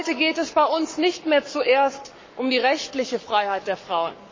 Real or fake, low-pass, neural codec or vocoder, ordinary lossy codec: fake; 7.2 kHz; codec, 44.1 kHz, 7.8 kbps, Pupu-Codec; MP3, 32 kbps